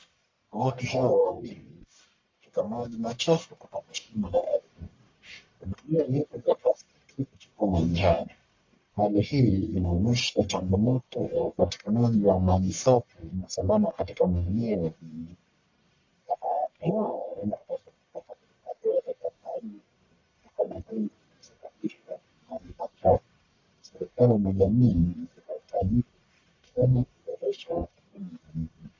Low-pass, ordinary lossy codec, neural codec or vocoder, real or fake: 7.2 kHz; MP3, 48 kbps; codec, 44.1 kHz, 1.7 kbps, Pupu-Codec; fake